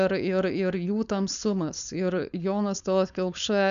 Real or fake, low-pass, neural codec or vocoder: fake; 7.2 kHz; codec, 16 kHz, 4.8 kbps, FACodec